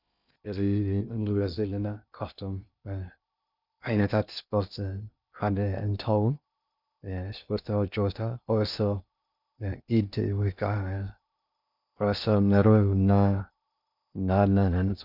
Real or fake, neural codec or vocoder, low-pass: fake; codec, 16 kHz in and 24 kHz out, 0.6 kbps, FocalCodec, streaming, 2048 codes; 5.4 kHz